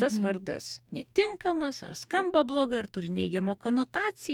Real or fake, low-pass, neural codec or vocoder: fake; 19.8 kHz; codec, 44.1 kHz, 2.6 kbps, DAC